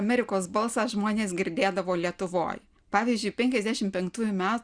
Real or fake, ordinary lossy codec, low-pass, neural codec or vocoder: real; Opus, 64 kbps; 9.9 kHz; none